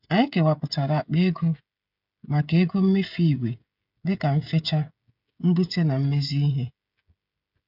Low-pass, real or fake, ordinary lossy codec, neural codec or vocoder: 5.4 kHz; fake; none; codec, 16 kHz, 16 kbps, FreqCodec, smaller model